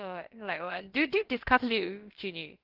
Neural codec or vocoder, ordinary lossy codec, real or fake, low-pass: codec, 16 kHz, about 1 kbps, DyCAST, with the encoder's durations; Opus, 16 kbps; fake; 5.4 kHz